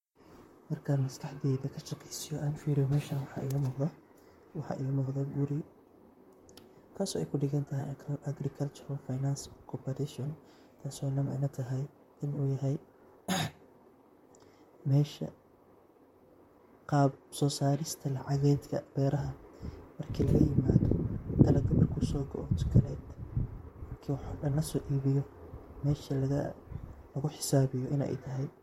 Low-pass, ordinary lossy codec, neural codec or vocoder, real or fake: 19.8 kHz; MP3, 64 kbps; vocoder, 44.1 kHz, 128 mel bands, Pupu-Vocoder; fake